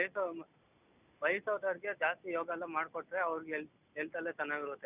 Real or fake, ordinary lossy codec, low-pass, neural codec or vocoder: real; none; 3.6 kHz; none